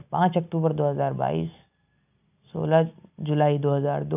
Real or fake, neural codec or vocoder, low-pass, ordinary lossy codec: real; none; 3.6 kHz; none